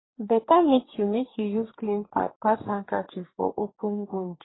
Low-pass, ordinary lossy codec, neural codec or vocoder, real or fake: 7.2 kHz; AAC, 16 kbps; codec, 44.1 kHz, 2.6 kbps, DAC; fake